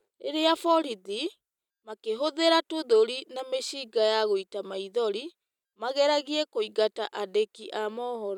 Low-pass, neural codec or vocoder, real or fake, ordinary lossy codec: 19.8 kHz; none; real; none